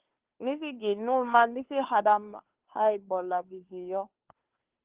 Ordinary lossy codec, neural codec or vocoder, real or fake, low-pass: Opus, 16 kbps; codec, 16 kHz, 2 kbps, FunCodec, trained on Chinese and English, 25 frames a second; fake; 3.6 kHz